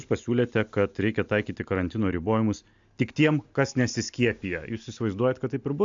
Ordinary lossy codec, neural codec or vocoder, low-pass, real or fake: AAC, 64 kbps; none; 7.2 kHz; real